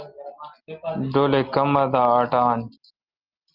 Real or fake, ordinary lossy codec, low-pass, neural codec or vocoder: real; Opus, 24 kbps; 5.4 kHz; none